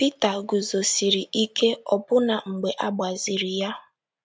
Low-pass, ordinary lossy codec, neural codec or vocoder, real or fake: none; none; none; real